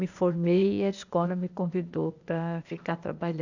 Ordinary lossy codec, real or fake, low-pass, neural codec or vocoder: none; fake; 7.2 kHz; codec, 16 kHz, 0.8 kbps, ZipCodec